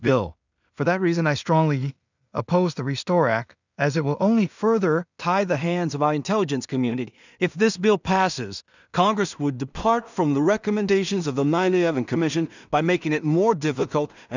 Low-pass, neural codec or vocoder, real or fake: 7.2 kHz; codec, 16 kHz in and 24 kHz out, 0.4 kbps, LongCat-Audio-Codec, two codebook decoder; fake